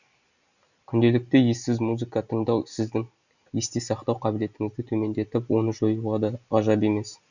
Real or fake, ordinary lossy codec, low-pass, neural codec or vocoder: real; none; 7.2 kHz; none